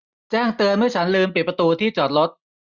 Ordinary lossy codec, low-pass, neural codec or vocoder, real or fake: Opus, 64 kbps; 7.2 kHz; none; real